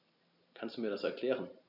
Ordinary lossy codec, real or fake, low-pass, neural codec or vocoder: none; real; 5.4 kHz; none